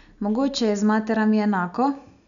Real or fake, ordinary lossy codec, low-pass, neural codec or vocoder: real; none; 7.2 kHz; none